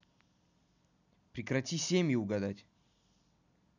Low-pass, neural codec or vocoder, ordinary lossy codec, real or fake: 7.2 kHz; none; none; real